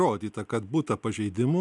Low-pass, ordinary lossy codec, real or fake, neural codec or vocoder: 10.8 kHz; MP3, 96 kbps; real; none